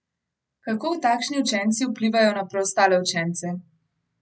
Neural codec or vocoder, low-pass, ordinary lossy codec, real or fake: none; none; none; real